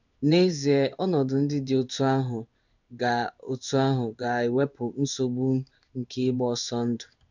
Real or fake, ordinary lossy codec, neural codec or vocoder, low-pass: fake; none; codec, 16 kHz in and 24 kHz out, 1 kbps, XY-Tokenizer; 7.2 kHz